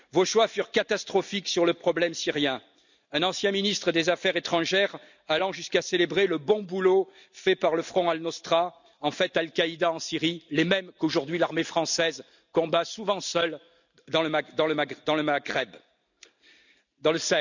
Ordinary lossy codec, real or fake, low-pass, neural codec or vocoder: none; real; 7.2 kHz; none